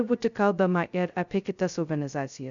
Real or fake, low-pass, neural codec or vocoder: fake; 7.2 kHz; codec, 16 kHz, 0.2 kbps, FocalCodec